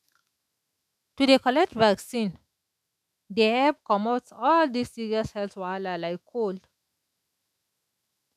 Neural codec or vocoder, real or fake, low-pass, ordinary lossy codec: autoencoder, 48 kHz, 128 numbers a frame, DAC-VAE, trained on Japanese speech; fake; 14.4 kHz; none